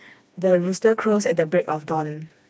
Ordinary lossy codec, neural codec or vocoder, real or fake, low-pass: none; codec, 16 kHz, 2 kbps, FreqCodec, smaller model; fake; none